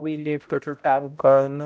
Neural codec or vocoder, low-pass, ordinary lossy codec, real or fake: codec, 16 kHz, 0.5 kbps, X-Codec, HuBERT features, trained on balanced general audio; none; none; fake